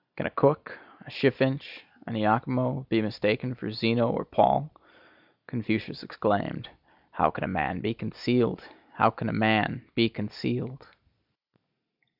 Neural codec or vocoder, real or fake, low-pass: none; real; 5.4 kHz